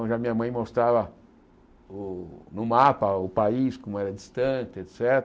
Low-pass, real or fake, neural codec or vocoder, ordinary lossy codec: none; real; none; none